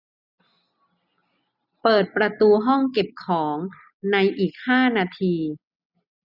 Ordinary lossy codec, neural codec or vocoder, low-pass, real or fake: none; none; 5.4 kHz; real